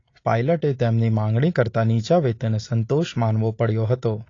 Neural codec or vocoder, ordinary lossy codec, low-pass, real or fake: none; AAC, 32 kbps; 7.2 kHz; real